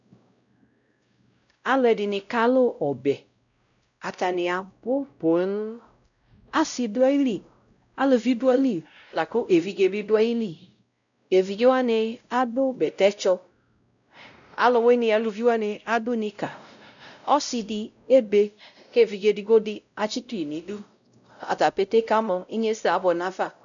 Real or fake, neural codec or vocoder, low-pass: fake; codec, 16 kHz, 0.5 kbps, X-Codec, WavLM features, trained on Multilingual LibriSpeech; 7.2 kHz